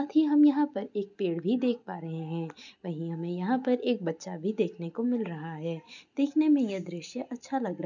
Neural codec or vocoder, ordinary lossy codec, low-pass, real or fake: none; none; 7.2 kHz; real